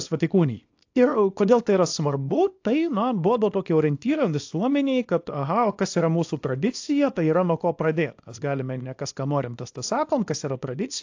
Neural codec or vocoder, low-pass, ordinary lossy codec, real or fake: codec, 24 kHz, 0.9 kbps, WavTokenizer, small release; 7.2 kHz; AAC, 48 kbps; fake